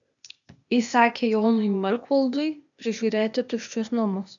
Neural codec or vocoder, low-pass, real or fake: codec, 16 kHz, 0.8 kbps, ZipCodec; 7.2 kHz; fake